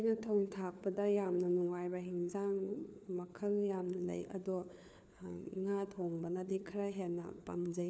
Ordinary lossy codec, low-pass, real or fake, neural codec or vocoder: none; none; fake; codec, 16 kHz, 4 kbps, FunCodec, trained on LibriTTS, 50 frames a second